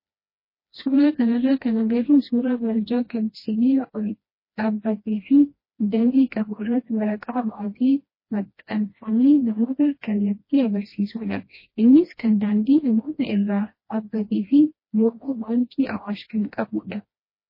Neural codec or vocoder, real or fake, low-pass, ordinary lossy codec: codec, 16 kHz, 1 kbps, FreqCodec, smaller model; fake; 5.4 kHz; MP3, 24 kbps